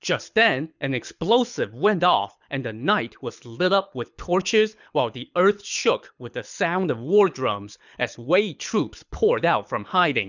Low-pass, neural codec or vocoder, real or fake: 7.2 kHz; codec, 24 kHz, 6 kbps, HILCodec; fake